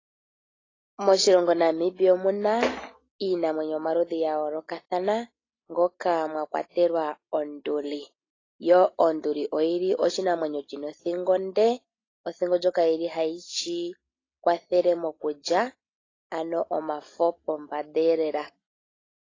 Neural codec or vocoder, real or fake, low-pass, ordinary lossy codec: none; real; 7.2 kHz; AAC, 32 kbps